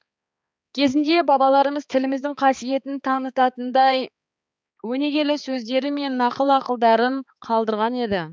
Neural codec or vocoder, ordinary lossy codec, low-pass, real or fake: codec, 16 kHz, 4 kbps, X-Codec, HuBERT features, trained on balanced general audio; none; none; fake